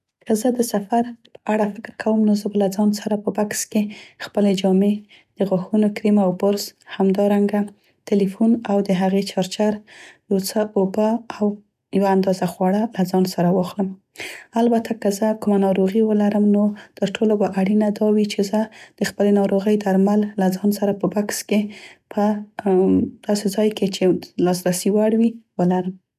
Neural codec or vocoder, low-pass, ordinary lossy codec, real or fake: none; 14.4 kHz; none; real